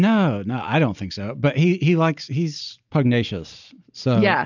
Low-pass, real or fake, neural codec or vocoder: 7.2 kHz; real; none